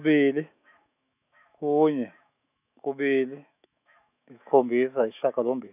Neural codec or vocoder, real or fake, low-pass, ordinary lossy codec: autoencoder, 48 kHz, 128 numbers a frame, DAC-VAE, trained on Japanese speech; fake; 3.6 kHz; none